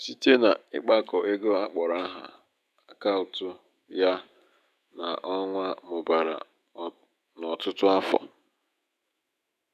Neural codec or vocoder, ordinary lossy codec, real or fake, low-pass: autoencoder, 48 kHz, 128 numbers a frame, DAC-VAE, trained on Japanese speech; none; fake; 14.4 kHz